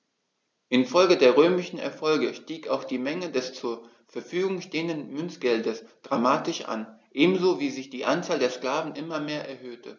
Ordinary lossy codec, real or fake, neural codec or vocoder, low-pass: none; real; none; none